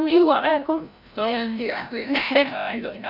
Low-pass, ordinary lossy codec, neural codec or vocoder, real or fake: 5.4 kHz; none; codec, 16 kHz, 0.5 kbps, FreqCodec, larger model; fake